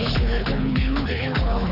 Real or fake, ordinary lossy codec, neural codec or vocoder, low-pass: fake; none; codec, 24 kHz, 6 kbps, HILCodec; 5.4 kHz